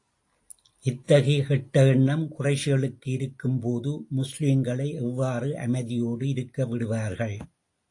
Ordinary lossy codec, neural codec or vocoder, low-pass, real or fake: AAC, 48 kbps; none; 10.8 kHz; real